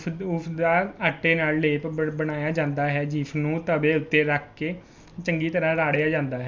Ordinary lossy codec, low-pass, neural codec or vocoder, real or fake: none; none; none; real